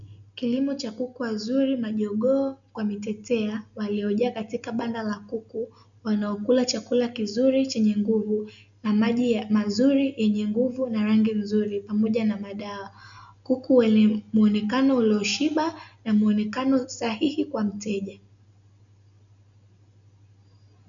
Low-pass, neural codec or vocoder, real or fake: 7.2 kHz; none; real